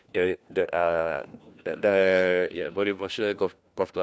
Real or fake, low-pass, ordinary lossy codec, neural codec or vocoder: fake; none; none; codec, 16 kHz, 1 kbps, FunCodec, trained on LibriTTS, 50 frames a second